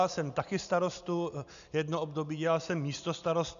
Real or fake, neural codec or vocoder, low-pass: real; none; 7.2 kHz